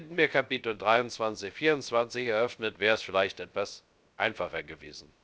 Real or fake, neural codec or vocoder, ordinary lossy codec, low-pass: fake; codec, 16 kHz, 0.3 kbps, FocalCodec; none; none